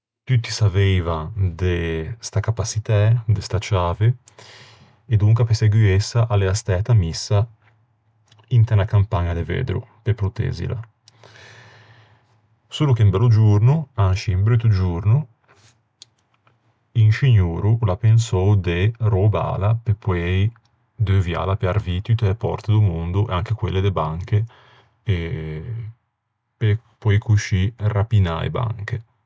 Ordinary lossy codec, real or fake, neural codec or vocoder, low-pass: none; real; none; none